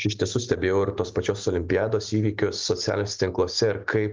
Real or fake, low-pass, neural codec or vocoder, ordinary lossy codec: real; 7.2 kHz; none; Opus, 32 kbps